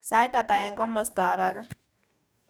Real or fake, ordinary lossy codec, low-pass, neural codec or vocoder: fake; none; none; codec, 44.1 kHz, 2.6 kbps, DAC